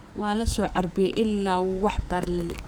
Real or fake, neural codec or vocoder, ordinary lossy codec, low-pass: fake; codec, 44.1 kHz, 2.6 kbps, SNAC; none; none